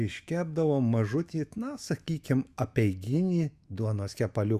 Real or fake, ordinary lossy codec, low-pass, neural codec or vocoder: fake; Opus, 64 kbps; 14.4 kHz; autoencoder, 48 kHz, 128 numbers a frame, DAC-VAE, trained on Japanese speech